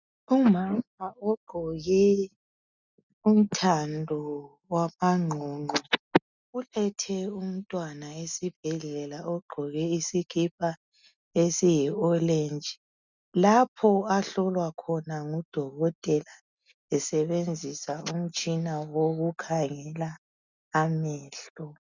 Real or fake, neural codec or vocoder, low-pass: real; none; 7.2 kHz